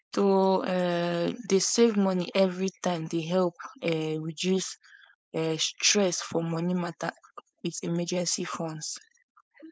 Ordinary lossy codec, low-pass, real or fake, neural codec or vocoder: none; none; fake; codec, 16 kHz, 4.8 kbps, FACodec